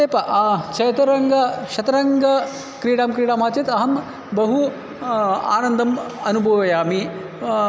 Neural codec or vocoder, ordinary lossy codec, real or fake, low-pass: none; none; real; none